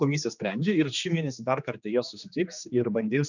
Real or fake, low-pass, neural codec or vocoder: fake; 7.2 kHz; codec, 16 kHz, 2 kbps, X-Codec, HuBERT features, trained on general audio